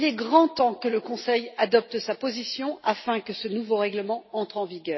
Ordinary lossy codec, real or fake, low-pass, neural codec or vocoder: MP3, 24 kbps; real; 7.2 kHz; none